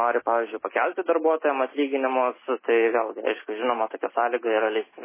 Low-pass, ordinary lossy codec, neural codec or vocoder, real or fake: 3.6 kHz; MP3, 16 kbps; none; real